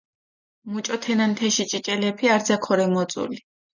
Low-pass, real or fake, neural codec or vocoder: 7.2 kHz; real; none